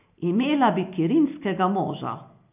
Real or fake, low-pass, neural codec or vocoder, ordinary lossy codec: real; 3.6 kHz; none; none